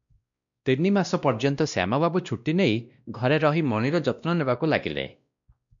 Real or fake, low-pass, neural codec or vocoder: fake; 7.2 kHz; codec, 16 kHz, 1 kbps, X-Codec, WavLM features, trained on Multilingual LibriSpeech